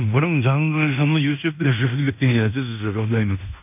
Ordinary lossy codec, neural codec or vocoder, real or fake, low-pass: none; codec, 16 kHz in and 24 kHz out, 0.9 kbps, LongCat-Audio-Codec, fine tuned four codebook decoder; fake; 3.6 kHz